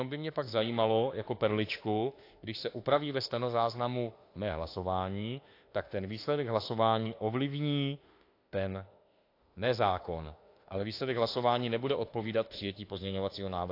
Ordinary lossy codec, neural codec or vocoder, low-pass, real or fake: AAC, 32 kbps; autoencoder, 48 kHz, 32 numbers a frame, DAC-VAE, trained on Japanese speech; 5.4 kHz; fake